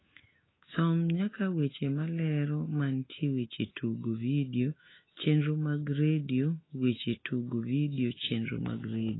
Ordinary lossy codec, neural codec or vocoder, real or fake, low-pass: AAC, 16 kbps; autoencoder, 48 kHz, 128 numbers a frame, DAC-VAE, trained on Japanese speech; fake; 7.2 kHz